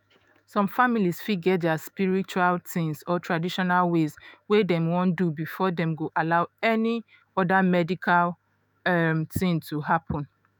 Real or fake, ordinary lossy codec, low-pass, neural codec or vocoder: fake; none; none; autoencoder, 48 kHz, 128 numbers a frame, DAC-VAE, trained on Japanese speech